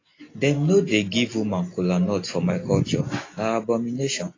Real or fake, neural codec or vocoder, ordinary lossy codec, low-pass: real; none; AAC, 32 kbps; 7.2 kHz